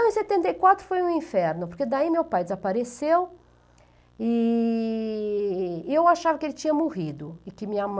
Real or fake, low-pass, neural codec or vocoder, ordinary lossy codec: real; none; none; none